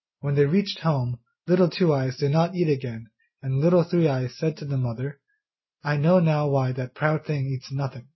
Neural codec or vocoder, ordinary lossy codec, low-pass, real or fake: none; MP3, 24 kbps; 7.2 kHz; real